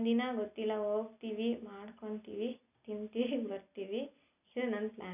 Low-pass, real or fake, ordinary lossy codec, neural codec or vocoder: 3.6 kHz; real; none; none